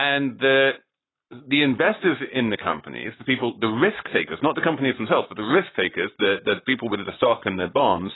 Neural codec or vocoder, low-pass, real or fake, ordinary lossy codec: codec, 44.1 kHz, 7.8 kbps, Pupu-Codec; 7.2 kHz; fake; AAC, 16 kbps